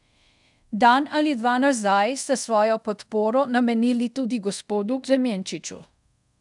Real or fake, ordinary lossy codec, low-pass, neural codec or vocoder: fake; none; 10.8 kHz; codec, 24 kHz, 0.5 kbps, DualCodec